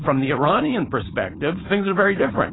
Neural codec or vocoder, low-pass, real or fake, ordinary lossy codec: codec, 16 kHz, 4.8 kbps, FACodec; 7.2 kHz; fake; AAC, 16 kbps